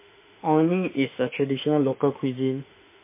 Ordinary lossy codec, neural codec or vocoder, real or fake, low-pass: MP3, 24 kbps; autoencoder, 48 kHz, 32 numbers a frame, DAC-VAE, trained on Japanese speech; fake; 3.6 kHz